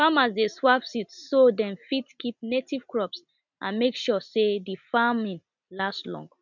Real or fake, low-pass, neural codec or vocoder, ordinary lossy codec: real; 7.2 kHz; none; none